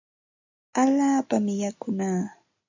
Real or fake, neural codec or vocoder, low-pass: real; none; 7.2 kHz